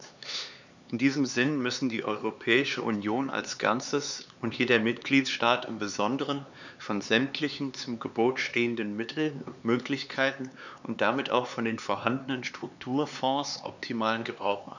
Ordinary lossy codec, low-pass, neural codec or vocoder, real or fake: none; 7.2 kHz; codec, 16 kHz, 4 kbps, X-Codec, HuBERT features, trained on LibriSpeech; fake